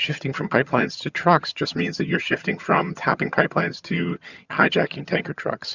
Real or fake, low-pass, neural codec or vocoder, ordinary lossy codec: fake; 7.2 kHz; vocoder, 22.05 kHz, 80 mel bands, HiFi-GAN; Opus, 64 kbps